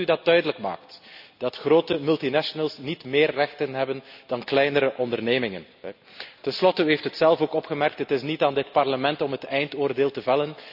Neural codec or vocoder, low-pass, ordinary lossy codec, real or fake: none; 5.4 kHz; none; real